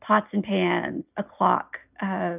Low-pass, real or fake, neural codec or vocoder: 3.6 kHz; real; none